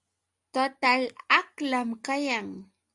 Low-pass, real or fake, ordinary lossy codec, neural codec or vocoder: 10.8 kHz; real; AAC, 64 kbps; none